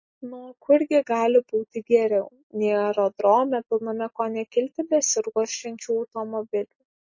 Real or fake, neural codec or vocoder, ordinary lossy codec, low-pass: real; none; MP3, 32 kbps; 7.2 kHz